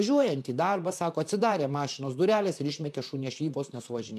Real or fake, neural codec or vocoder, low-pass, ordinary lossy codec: fake; vocoder, 44.1 kHz, 128 mel bands, Pupu-Vocoder; 14.4 kHz; MP3, 96 kbps